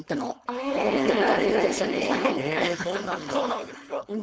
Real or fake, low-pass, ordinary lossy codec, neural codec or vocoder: fake; none; none; codec, 16 kHz, 4.8 kbps, FACodec